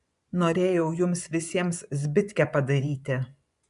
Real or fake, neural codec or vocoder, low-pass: fake; vocoder, 24 kHz, 100 mel bands, Vocos; 10.8 kHz